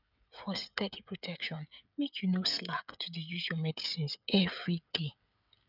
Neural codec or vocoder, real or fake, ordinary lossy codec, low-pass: codec, 16 kHz, 16 kbps, FreqCodec, smaller model; fake; none; 5.4 kHz